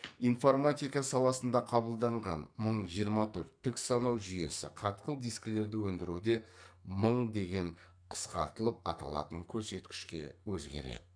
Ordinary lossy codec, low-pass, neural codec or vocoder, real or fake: none; 9.9 kHz; codec, 44.1 kHz, 2.6 kbps, SNAC; fake